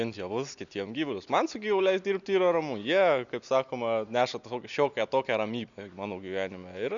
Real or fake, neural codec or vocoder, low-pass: real; none; 7.2 kHz